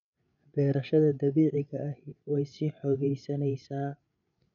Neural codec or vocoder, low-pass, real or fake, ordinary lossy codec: codec, 16 kHz, 16 kbps, FreqCodec, larger model; 7.2 kHz; fake; none